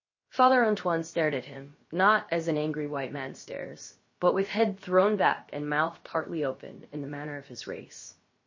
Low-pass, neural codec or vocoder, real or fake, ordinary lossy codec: 7.2 kHz; codec, 16 kHz, 0.7 kbps, FocalCodec; fake; MP3, 32 kbps